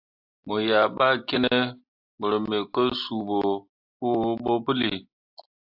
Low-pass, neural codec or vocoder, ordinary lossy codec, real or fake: 5.4 kHz; none; AAC, 48 kbps; real